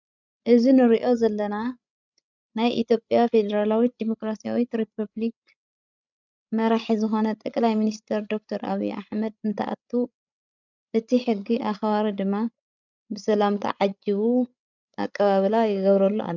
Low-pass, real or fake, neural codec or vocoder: 7.2 kHz; fake; codec, 16 kHz, 16 kbps, FreqCodec, larger model